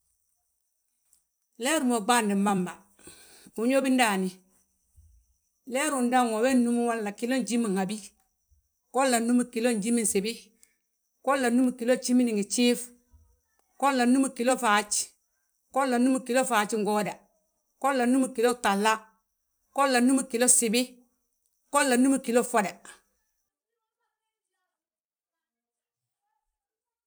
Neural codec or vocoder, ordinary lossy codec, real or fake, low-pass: vocoder, 44.1 kHz, 128 mel bands every 256 samples, BigVGAN v2; none; fake; none